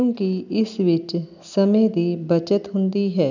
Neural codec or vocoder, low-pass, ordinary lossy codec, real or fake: none; 7.2 kHz; none; real